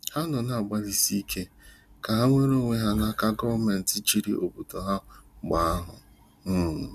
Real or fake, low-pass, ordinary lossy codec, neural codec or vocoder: real; 14.4 kHz; none; none